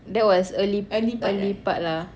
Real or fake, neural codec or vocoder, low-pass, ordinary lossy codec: real; none; none; none